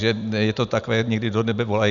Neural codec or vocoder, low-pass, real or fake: none; 7.2 kHz; real